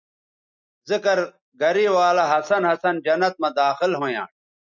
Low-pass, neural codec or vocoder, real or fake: 7.2 kHz; none; real